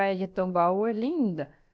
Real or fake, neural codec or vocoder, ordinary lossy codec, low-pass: fake; codec, 16 kHz, about 1 kbps, DyCAST, with the encoder's durations; none; none